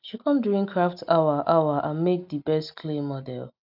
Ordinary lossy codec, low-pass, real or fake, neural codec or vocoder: none; 5.4 kHz; real; none